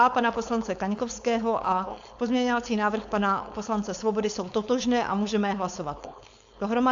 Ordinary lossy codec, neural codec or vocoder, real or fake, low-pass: AAC, 64 kbps; codec, 16 kHz, 4.8 kbps, FACodec; fake; 7.2 kHz